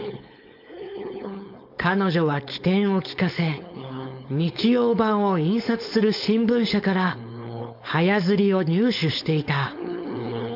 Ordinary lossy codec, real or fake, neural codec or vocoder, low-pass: AAC, 48 kbps; fake; codec, 16 kHz, 4.8 kbps, FACodec; 5.4 kHz